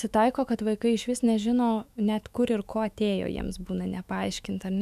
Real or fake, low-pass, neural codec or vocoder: fake; 14.4 kHz; autoencoder, 48 kHz, 128 numbers a frame, DAC-VAE, trained on Japanese speech